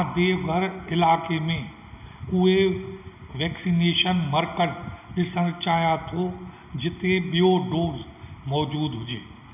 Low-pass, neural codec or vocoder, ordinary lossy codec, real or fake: 3.6 kHz; none; none; real